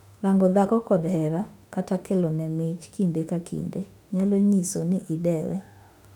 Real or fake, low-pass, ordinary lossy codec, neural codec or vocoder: fake; 19.8 kHz; none; autoencoder, 48 kHz, 32 numbers a frame, DAC-VAE, trained on Japanese speech